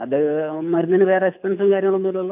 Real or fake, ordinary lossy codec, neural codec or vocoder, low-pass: fake; AAC, 32 kbps; codec, 24 kHz, 6 kbps, HILCodec; 3.6 kHz